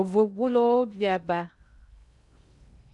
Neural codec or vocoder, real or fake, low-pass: codec, 16 kHz in and 24 kHz out, 0.6 kbps, FocalCodec, streaming, 2048 codes; fake; 10.8 kHz